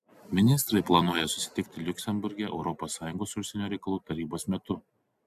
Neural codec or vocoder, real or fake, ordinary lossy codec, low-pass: none; real; AAC, 96 kbps; 14.4 kHz